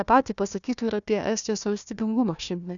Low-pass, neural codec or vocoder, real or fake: 7.2 kHz; codec, 16 kHz, 1 kbps, FunCodec, trained on Chinese and English, 50 frames a second; fake